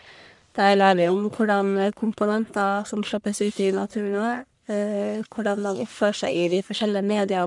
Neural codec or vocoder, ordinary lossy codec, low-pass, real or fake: codec, 44.1 kHz, 1.7 kbps, Pupu-Codec; none; 10.8 kHz; fake